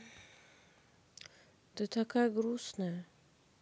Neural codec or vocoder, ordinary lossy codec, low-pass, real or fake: none; none; none; real